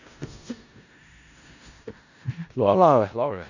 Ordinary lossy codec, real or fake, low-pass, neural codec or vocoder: none; fake; 7.2 kHz; codec, 16 kHz in and 24 kHz out, 0.4 kbps, LongCat-Audio-Codec, four codebook decoder